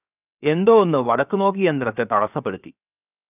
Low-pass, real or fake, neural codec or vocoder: 3.6 kHz; fake; codec, 16 kHz, 0.7 kbps, FocalCodec